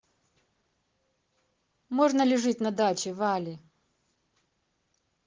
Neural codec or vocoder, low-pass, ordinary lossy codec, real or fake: none; 7.2 kHz; Opus, 16 kbps; real